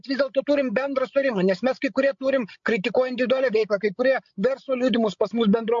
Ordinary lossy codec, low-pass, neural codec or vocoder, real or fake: MP3, 48 kbps; 7.2 kHz; codec, 16 kHz, 16 kbps, FreqCodec, larger model; fake